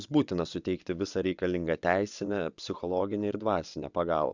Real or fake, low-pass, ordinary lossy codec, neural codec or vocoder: fake; 7.2 kHz; Opus, 64 kbps; vocoder, 22.05 kHz, 80 mel bands, WaveNeXt